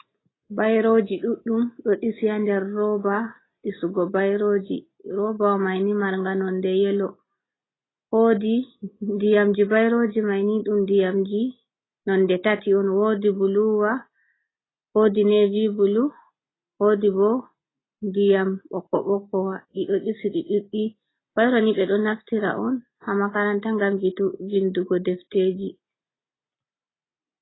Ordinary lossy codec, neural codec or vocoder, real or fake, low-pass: AAC, 16 kbps; none; real; 7.2 kHz